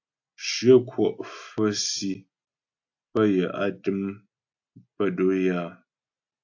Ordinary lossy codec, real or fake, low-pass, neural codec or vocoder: AAC, 48 kbps; real; 7.2 kHz; none